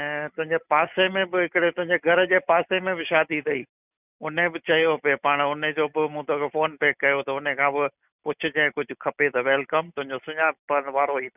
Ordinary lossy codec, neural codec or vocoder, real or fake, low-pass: none; none; real; 3.6 kHz